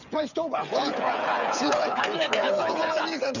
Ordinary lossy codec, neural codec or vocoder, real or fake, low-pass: none; codec, 16 kHz, 8 kbps, FreqCodec, smaller model; fake; 7.2 kHz